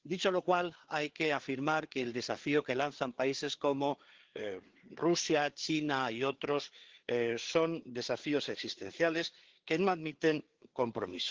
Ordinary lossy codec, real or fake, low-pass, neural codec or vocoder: Opus, 16 kbps; fake; 7.2 kHz; codec, 16 kHz, 4 kbps, FreqCodec, larger model